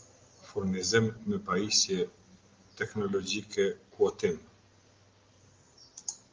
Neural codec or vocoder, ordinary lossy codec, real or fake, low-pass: none; Opus, 24 kbps; real; 7.2 kHz